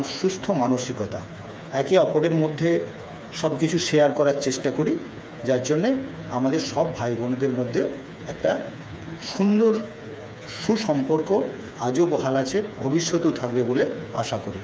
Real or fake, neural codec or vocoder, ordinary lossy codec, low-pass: fake; codec, 16 kHz, 4 kbps, FreqCodec, smaller model; none; none